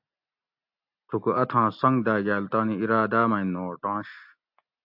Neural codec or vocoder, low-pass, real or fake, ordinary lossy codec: none; 5.4 kHz; real; AAC, 48 kbps